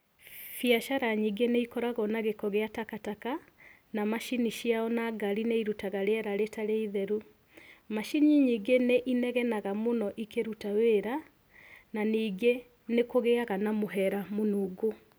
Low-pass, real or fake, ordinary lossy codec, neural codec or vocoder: none; real; none; none